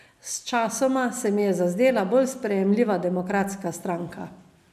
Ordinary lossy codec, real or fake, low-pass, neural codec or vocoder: none; real; 14.4 kHz; none